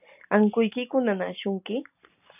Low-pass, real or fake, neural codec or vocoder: 3.6 kHz; real; none